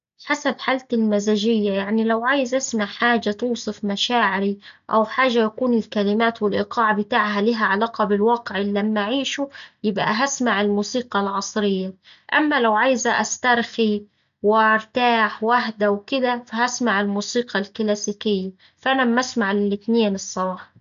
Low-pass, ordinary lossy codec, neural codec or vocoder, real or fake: 7.2 kHz; MP3, 96 kbps; none; real